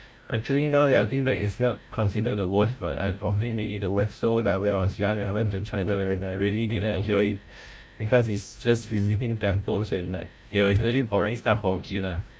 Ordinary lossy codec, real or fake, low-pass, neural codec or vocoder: none; fake; none; codec, 16 kHz, 0.5 kbps, FreqCodec, larger model